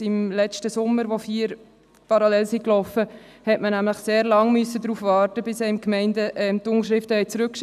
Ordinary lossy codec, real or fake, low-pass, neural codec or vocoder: none; real; 14.4 kHz; none